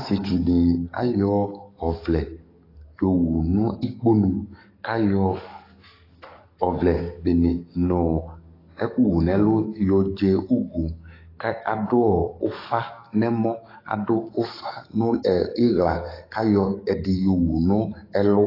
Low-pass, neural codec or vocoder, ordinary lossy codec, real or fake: 5.4 kHz; codec, 44.1 kHz, 7.8 kbps, DAC; AAC, 24 kbps; fake